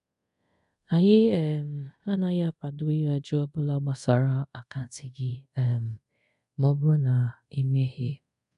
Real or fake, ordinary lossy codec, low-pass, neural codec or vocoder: fake; none; 10.8 kHz; codec, 24 kHz, 0.5 kbps, DualCodec